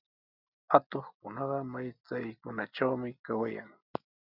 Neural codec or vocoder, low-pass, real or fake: none; 5.4 kHz; real